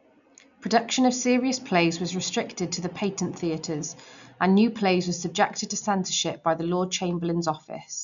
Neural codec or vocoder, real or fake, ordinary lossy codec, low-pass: none; real; none; 7.2 kHz